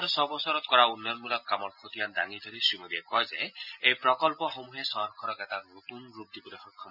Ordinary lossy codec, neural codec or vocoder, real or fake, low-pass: none; none; real; 5.4 kHz